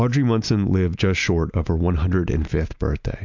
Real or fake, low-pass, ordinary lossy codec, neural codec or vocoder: real; 7.2 kHz; MP3, 64 kbps; none